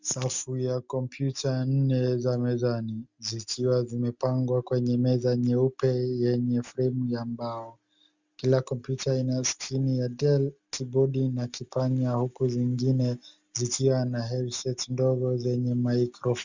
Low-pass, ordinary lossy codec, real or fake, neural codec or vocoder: 7.2 kHz; Opus, 64 kbps; real; none